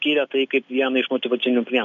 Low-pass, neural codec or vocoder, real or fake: 7.2 kHz; none; real